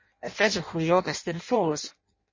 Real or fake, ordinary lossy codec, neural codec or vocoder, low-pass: fake; MP3, 32 kbps; codec, 16 kHz in and 24 kHz out, 0.6 kbps, FireRedTTS-2 codec; 7.2 kHz